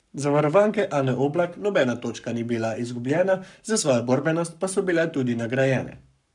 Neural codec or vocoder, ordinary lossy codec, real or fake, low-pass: codec, 44.1 kHz, 7.8 kbps, Pupu-Codec; none; fake; 10.8 kHz